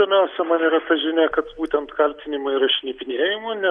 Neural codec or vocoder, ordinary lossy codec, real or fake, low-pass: none; Opus, 64 kbps; real; 9.9 kHz